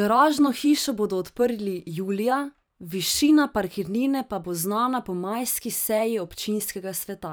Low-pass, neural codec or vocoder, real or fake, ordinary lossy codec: none; vocoder, 44.1 kHz, 128 mel bands every 512 samples, BigVGAN v2; fake; none